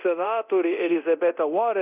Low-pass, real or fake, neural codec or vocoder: 3.6 kHz; fake; codec, 24 kHz, 0.9 kbps, DualCodec